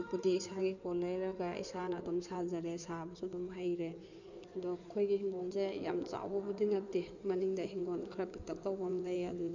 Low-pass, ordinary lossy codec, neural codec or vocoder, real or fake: 7.2 kHz; none; codec, 16 kHz in and 24 kHz out, 2.2 kbps, FireRedTTS-2 codec; fake